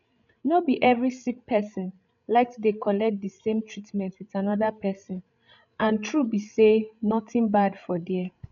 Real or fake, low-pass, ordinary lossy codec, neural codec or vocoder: fake; 7.2 kHz; none; codec, 16 kHz, 16 kbps, FreqCodec, larger model